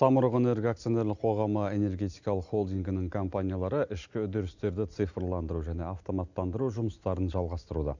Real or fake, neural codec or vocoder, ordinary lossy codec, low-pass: real; none; none; 7.2 kHz